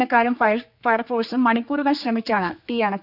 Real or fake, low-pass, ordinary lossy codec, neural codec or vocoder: fake; 5.4 kHz; none; codec, 16 kHz, 4 kbps, X-Codec, HuBERT features, trained on general audio